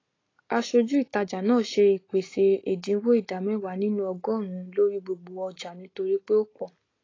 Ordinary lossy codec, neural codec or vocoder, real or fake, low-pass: AAC, 32 kbps; none; real; 7.2 kHz